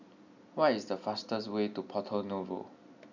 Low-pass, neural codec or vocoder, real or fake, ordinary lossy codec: 7.2 kHz; none; real; none